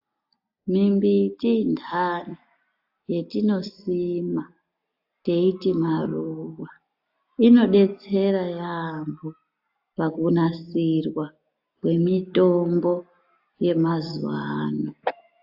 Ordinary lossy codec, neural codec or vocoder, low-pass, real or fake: AAC, 48 kbps; none; 5.4 kHz; real